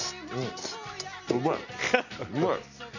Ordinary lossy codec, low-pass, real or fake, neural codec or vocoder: none; 7.2 kHz; real; none